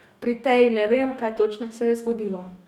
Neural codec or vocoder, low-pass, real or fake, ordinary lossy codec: codec, 44.1 kHz, 2.6 kbps, DAC; 19.8 kHz; fake; none